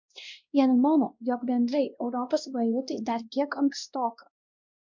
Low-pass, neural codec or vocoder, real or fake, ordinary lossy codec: 7.2 kHz; codec, 16 kHz, 1 kbps, X-Codec, WavLM features, trained on Multilingual LibriSpeech; fake; MP3, 64 kbps